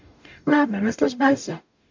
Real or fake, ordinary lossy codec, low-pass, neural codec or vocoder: fake; MP3, 64 kbps; 7.2 kHz; codec, 44.1 kHz, 0.9 kbps, DAC